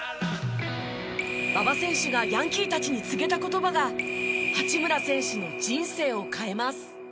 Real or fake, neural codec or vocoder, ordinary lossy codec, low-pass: real; none; none; none